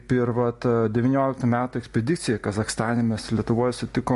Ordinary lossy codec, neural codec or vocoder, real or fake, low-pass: MP3, 48 kbps; none; real; 14.4 kHz